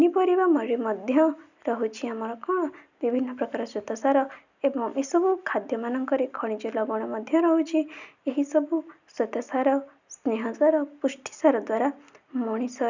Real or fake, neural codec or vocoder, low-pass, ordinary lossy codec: real; none; 7.2 kHz; none